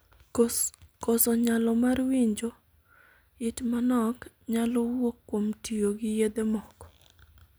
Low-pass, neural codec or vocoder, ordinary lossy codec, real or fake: none; none; none; real